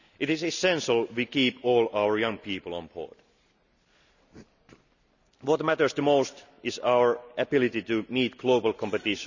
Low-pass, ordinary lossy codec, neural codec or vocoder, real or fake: 7.2 kHz; none; none; real